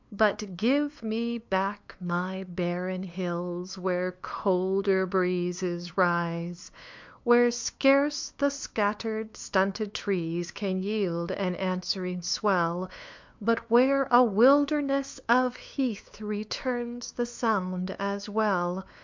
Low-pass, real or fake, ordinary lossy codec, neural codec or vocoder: 7.2 kHz; fake; MP3, 64 kbps; codec, 16 kHz, 2 kbps, FunCodec, trained on LibriTTS, 25 frames a second